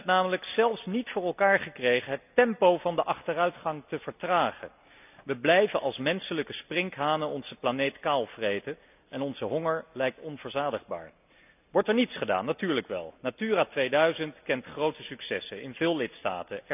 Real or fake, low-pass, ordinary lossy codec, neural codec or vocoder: real; 3.6 kHz; none; none